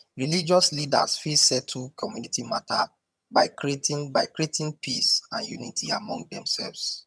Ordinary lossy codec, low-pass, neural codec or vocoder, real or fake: none; none; vocoder, 22.05 kHz, 80 mel bands, HiFi-GAN; fake